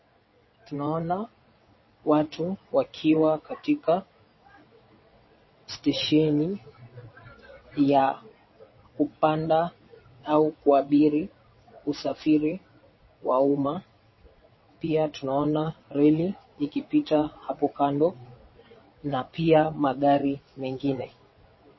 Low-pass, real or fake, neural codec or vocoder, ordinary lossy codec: 7.2 kHz; fake; vocoder, 22.05 kHz, 80 mel bands, WaveNeXt; MP3, 24 kbps